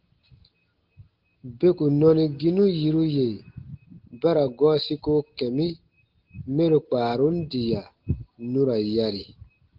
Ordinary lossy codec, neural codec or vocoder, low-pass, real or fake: Opus, 16 kbps; none; 5.4 kHz; real